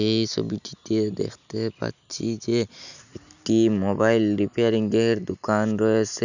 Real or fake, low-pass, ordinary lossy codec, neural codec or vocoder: real; 7.2 kHz; none; none